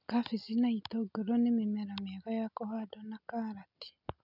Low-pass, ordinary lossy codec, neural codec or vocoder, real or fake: 5.4 kHz; none; none; real